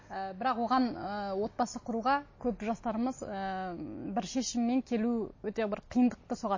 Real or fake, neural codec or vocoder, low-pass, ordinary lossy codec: real; none; 7.2 kHz; MP3, 32 kbps